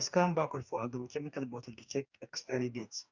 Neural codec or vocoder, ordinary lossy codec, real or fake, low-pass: codec, 44.1 kHz, 2.6 kbps, DAC; none; fake; 7.2 kHz